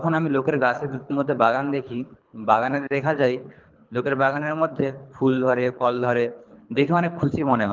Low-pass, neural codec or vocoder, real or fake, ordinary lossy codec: 7.2 kHz; codec, 24 kHz, 3 kbps, HILCodec; fake; Opus, 32 kbps